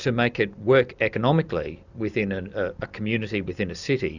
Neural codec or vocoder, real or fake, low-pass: none; real; 7.2 kHz